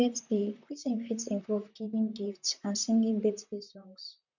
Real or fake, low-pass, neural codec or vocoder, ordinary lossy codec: fake; 7.2 kHz; vocoder, 44.1 kHz, 128 mel bands, Pupu-Vocoder; none